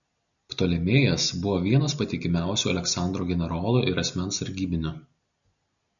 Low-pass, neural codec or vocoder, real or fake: 7.2 kHz; none; real